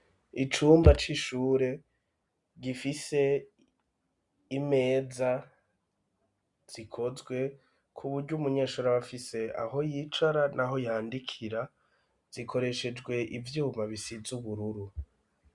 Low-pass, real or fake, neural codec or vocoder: 10.8 kHz; real; none